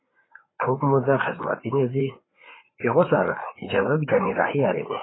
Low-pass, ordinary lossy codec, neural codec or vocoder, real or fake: 7.2 kHz; AAC, 16 kbps; codec, 16 kHz, 4 kbps, FreqCodec, larger model; fake